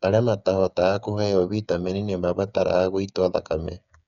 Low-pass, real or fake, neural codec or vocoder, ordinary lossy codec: 7.2 kHz; fake; codec, 16 kHz, 8 kbps, FreqCodec, smaller model; none